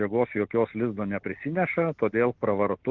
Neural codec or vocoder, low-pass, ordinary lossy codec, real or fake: none; 7.2 kHz; Opus, 32 kbps; real